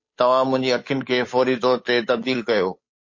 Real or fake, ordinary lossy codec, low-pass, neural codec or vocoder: fake; MP3, 32 kbps; 7.2 kHz; codec, 16 kHz, 8 kbps, FunCodec, trained on Chinese and English, 25 frames a second